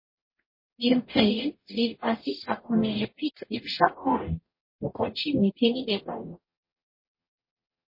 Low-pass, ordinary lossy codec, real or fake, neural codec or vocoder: 5.4 kHz; MP3, 24 kbps; fake; codec, 44.1 kHz, 0.9 kbps, DAC